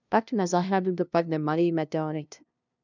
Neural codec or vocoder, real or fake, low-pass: codec, 16 kHz, 0.5 kbps, FunCodec, trained on LibriTTS, 25 frames a second; fake; 7.2 kHz